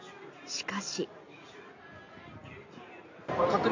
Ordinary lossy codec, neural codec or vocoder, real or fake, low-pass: AAC, 48 kbps; none; real; 7.2 kHz